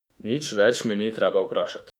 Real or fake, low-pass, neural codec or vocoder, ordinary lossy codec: fake; 19.8 kHz; autoencoder, 48 kHz, 32 numbers a frame, DAC-VAE, trained on Japanese speech; none